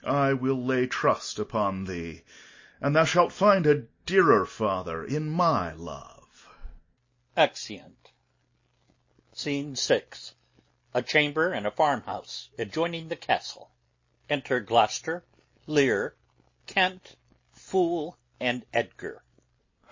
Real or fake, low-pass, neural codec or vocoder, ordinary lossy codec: real; 7.2 kHz; none; MP3, 32 kbps